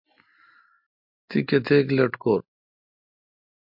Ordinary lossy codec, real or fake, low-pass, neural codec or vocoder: MP3, 48 kbps; real; 5.4 kHz; none